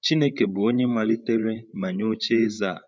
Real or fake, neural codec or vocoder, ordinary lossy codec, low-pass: fake; codec, 16 kHz, 8 kbps, FreqCodec, larger model; none; none